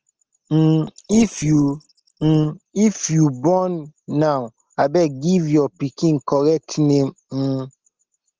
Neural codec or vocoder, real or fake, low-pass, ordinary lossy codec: none; real; 7.2 kHz; Opus, 16 kbps